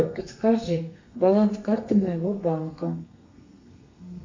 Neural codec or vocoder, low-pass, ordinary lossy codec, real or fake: codec, 32 kHz, 1.9 kbps, SNAC; 7.2 kHz; MP3, 48 kbps; fake